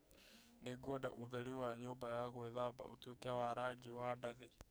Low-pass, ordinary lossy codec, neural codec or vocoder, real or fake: none; none; codec, 44.1 kHz, 2.6 kbps, SNAC; fake